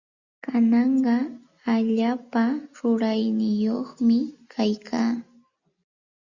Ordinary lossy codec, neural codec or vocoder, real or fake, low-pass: Opus, 64 kbps; none; real; 7.2 kHz